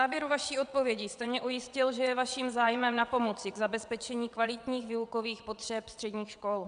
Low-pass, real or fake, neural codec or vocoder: 9.9 kHz; fake; vocoder, 22.05 kHz, 80 mel bands, WaveNeXt